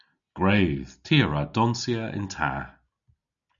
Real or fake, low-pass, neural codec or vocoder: real; 7.2 kHz; none